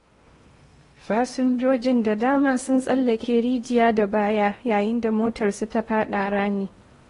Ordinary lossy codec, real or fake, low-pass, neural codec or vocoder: AAC, 32 kbps; fake; 10.8 kHz; codec, 16 kHz in and 24 kHz out, 0.8 kbps, FocalCodec, streaming, 65536 codes